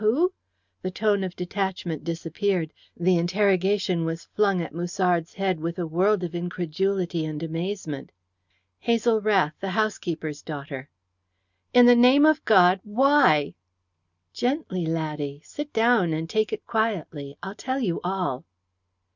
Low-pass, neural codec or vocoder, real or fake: 7.2 kHz; none; real